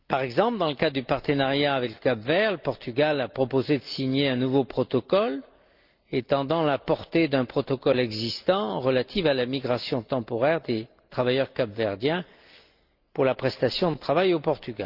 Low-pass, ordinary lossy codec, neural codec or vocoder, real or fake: 5.4 kHz; Opus, 24 kbps; none; real